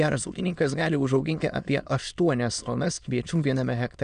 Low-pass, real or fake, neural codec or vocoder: 9.9 kHz; fake; autoencoder, 22.05 kHz, a latent of 192 numbers a frame, VITS, trained on many speakers